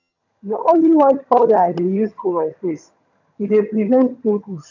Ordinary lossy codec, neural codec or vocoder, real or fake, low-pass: none; vocoder, 22.05 kHz, 80 mel bands, HiFi-GAN; fake; 7.2 kHz